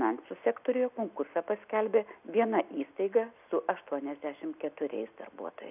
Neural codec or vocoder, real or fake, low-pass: none; real; 3.6 kHz